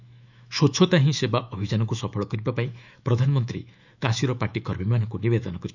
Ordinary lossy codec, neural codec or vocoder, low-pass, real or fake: none; autoencoder, 48 kHz, 128 numbers a frame, DAC-VAE, trained on Japanese speech; 7.2 kHz; fake